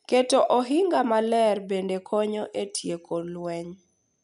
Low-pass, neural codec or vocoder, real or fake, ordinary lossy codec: 10.8 kHz; none; real; none